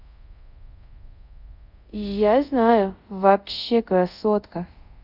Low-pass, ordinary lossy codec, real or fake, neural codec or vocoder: 5.4 kHz; none; fake; codec, 24 kHz, 0.5 kbps, DualCodec